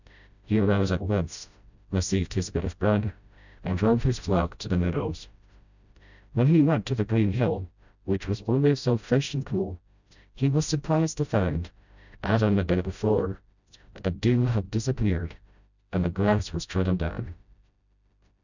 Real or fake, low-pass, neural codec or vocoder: fake; 7.2 kHz; codec, 16 kHz, 0.5 kbps, FreqCodec, smaller model